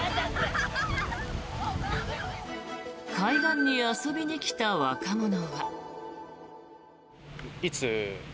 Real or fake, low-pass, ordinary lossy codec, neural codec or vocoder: real; none; none; none